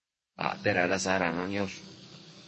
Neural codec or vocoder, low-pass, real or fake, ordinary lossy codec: codec, 44.1 kHz, 2.6 kbps, SNAC; 10.8 kHz; fake; MP3, 32 kbps